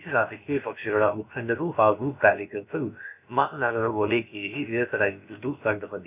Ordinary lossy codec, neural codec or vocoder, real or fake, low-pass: none; codec, 16 kHz, about 1 kbps, DyCAST, with the encoder's durations; fake; 3.6 kHz